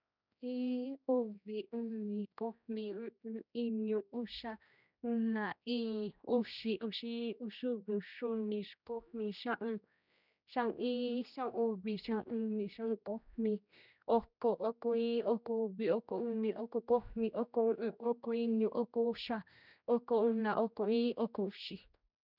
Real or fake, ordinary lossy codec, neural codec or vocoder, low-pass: fake; none; codec, 16 kHz, 1 kbps, X-Codec, HuBERT features, trained on general audio; 5.4 kHz